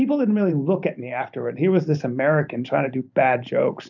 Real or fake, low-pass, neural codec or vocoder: fake; 7.2 kHz; vocoder, 44.1 kHz, 128 mel bands every 512 samples, BigVGAN v2